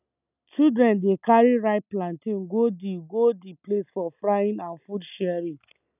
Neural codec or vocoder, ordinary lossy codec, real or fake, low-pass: none; none; real; 3.6 kHz